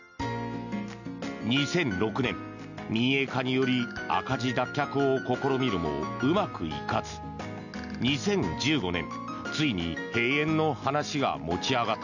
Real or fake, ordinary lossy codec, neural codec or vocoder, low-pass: real; none; none; 7.2 kHz